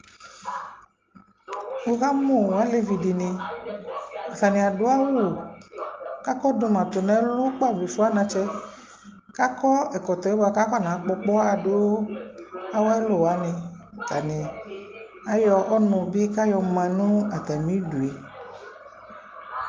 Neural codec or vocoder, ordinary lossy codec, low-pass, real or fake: none; Opus, 24 kbps; 7.2 kHz; real